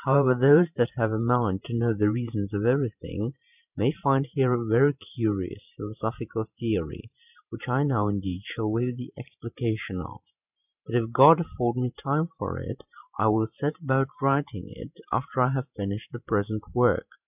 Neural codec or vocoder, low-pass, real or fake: vocoder, 44.1 kHz, 128 mel bands every 512 samples, BigVGAN v2; 3.6 kHz; fake